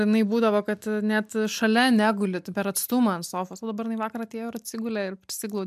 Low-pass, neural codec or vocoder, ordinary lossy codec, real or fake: 14.4 kHz; none; MP3, 96 kbps; real